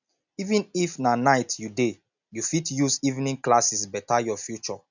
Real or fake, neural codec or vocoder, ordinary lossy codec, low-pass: real; none; none; 7.2 kHz